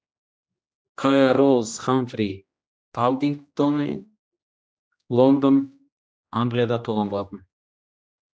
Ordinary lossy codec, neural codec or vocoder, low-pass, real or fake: none; codec, 16 kHz, 1 kbps, X-Codec, HuBERT features, trained on general audio; none; fake